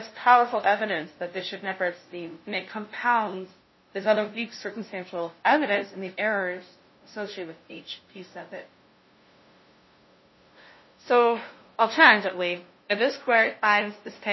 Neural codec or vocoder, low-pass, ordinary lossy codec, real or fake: codec, 16 kHz, 0.5 kbps, FunCodec, trained on LibriTTS, 25 frames a second; 7.2 kHz; MP3, 24 kbps; fake